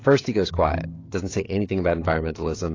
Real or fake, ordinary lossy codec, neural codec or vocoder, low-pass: fake; AAC, 32 kbps; codec, 16 kHz, 16 kbps, FreqCodec, smaller model; 7.2 kHz